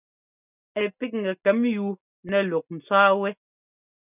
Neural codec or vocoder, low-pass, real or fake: none; 3.6 kHz; real